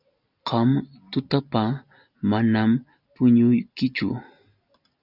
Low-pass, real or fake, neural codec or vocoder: 5.4 kHz; real; none